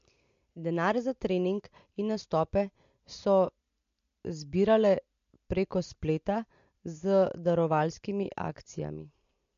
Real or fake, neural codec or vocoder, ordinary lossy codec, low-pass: real; none; MP3, 48 kbps; 7.2 kHz